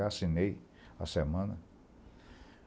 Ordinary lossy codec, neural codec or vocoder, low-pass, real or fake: none; none; none; real